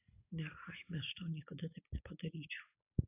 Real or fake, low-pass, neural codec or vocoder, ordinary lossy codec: real; 3.6 kHz; none; AAC, 32 kbps